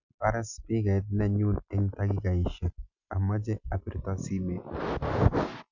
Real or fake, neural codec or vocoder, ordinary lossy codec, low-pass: real; none; none; 7.2 kHz